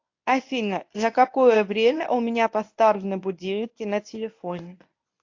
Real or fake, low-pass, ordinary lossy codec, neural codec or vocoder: fake; 7.2 kHz; AAC, 48 kbps; codec, 24 kHz, 0.9 kbps, WavTokenizer, medium speech release version 1